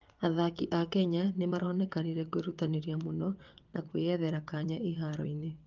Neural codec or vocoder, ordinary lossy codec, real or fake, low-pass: vocoder, 22.05 kHz, 80 mel bands, WaveNeXt; Opus, 32 kbps; fake; 7.2 kHz